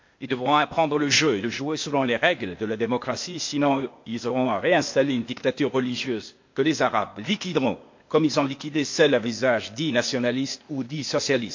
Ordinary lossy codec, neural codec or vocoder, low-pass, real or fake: MP3, 48 kbps; codec, 16 kHz, 0.8 kbps, ZipCodec; 7.2 kHz; fake